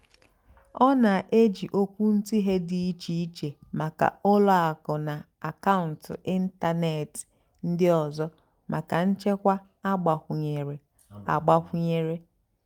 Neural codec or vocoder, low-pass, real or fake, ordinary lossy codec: none; 19.8 kHz; real; Opus, 32 kbps